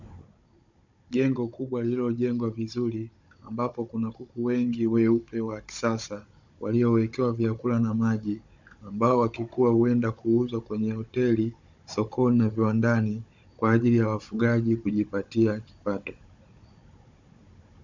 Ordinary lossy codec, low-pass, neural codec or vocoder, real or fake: MP3, 64 kbps; 7.2 kHz; codec, 16 kHz, 16 kbps, FunCodec, trained on Chinese and English, 50 frames a second; fake